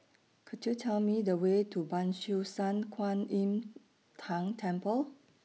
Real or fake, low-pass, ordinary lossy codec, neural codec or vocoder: real; none; none; none